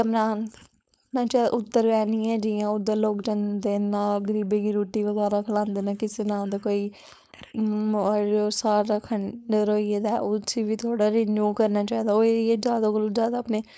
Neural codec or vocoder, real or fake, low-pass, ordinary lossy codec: codec, 16 kHz, 4.8 kbps, FACodec; fake; none; none